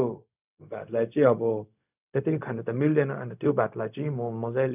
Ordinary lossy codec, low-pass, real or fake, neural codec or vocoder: none; 3.6 kHz; fake; codec, 16 kHz, 0.4 kbps, LongCat-Audio-Codec